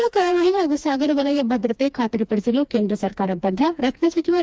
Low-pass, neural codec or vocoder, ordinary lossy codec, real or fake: none; codec, 16 kHz, 2 kbps, FreqCodec, smaller model; none; fake